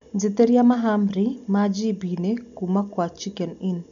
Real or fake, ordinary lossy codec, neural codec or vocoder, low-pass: real; none; none; 7.2 kHz